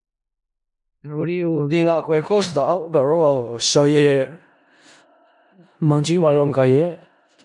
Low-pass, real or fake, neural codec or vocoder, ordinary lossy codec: 10.8 kHz; fake; codec, 16 kHz in and 24 kHz out, 0.4 kbps, LongCat-Audio-Codec, four codebook decoder; none